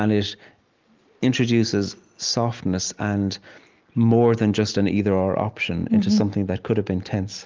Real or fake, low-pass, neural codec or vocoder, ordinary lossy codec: real; 7.2 kHz; none; Opus, 32 kbps